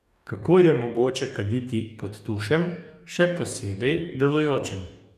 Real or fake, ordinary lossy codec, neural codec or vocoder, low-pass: fake; none; codec, 44.1 kHz, 2.6 kbps, DAC; 14.4 kHz